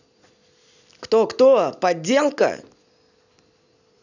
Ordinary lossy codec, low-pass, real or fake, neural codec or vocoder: none; 7.2 kHz; real; none